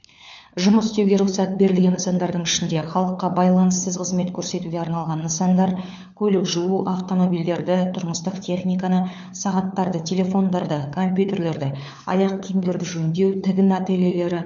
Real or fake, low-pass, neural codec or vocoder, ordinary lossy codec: fake; 7.2 kHz; codec, 16 kHz, 4 kbps, FunCodec, trained on LibriTTS, 50 frames a second; none